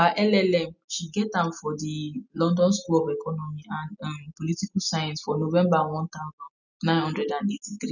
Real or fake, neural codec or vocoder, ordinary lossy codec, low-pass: real; none; none; 7.2 kHz